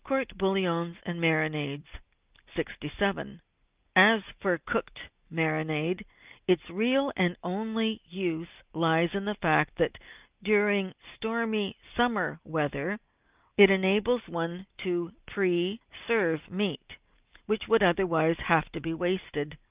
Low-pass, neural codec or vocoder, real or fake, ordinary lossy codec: 3.6 kHz; none; real; Opus, 24 kbps